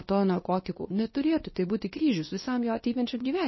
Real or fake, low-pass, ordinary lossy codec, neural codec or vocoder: fake; 7.2 kHz; MP3, 24 kbps; codec, 24 kHz, 0.9 kbps, WavTokenizer, medium speech release version 2